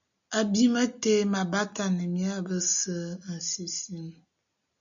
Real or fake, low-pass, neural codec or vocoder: real; 7.2 kHz; none